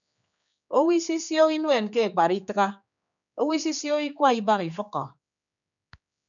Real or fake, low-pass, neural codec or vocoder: fake; 7.2 kHz; codec, 16 kHz, 4 kbps, X-Codec, HuBERT features, trained on general audio